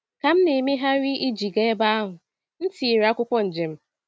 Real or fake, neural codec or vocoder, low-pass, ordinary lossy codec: real; none; none; none